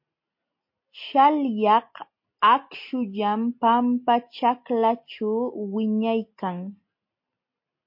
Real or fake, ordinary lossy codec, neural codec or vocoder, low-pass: real; MP3, 32 kbps; none; 5.4 kHz